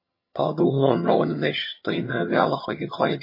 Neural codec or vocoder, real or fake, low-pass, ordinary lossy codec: vocoder, 22.05 kHz, 80 mel bands, HiFi-GAN; fake; 5.4 kHz; MP3, 24 kbps